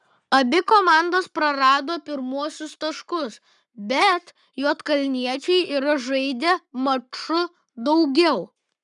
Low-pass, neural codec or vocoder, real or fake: 10.8 kHz; codec, 44.1 kHz, 7.8 kbps, Pupu-Codec; fake